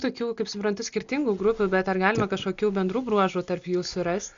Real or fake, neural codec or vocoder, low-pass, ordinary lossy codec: real; none; 7.2 kHz; Opus, 64 kbps